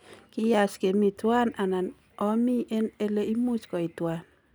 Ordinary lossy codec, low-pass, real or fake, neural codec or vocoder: none; none; real; none